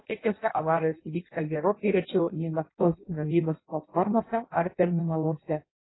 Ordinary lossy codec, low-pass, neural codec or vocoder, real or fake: AAC, 16 kbps; 7.2 kHz; codec, 16 kHz in and 24 kHz out, 0.6 kbps, FireRedTTS-2 codec; fake